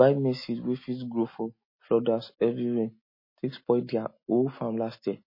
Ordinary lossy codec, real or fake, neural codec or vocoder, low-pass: MP3, 24 kbps; real; none; 5.4 kHz